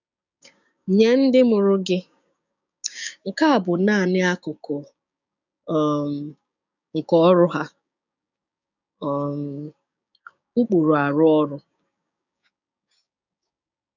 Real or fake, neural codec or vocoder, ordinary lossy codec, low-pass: fake; codec, 16 kHz, 6 kbps, DAC; none; 7.2 kHz